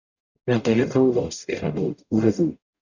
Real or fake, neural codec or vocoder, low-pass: fake; codec, 44.1 kHz, 0.9 kbps, DAC; 7.2 kHz